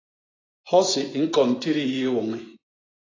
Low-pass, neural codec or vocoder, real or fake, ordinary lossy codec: 7.2 kHz; vocoder, 24 kHz, 100 mel bands, Vocos; fake; AAC, 32 kbps